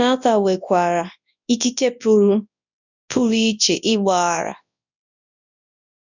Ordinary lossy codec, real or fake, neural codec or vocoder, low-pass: none; fake; codec, 24 kHz, 0.9 kbps, WavTokenizer, large speech release; 7.2 kHz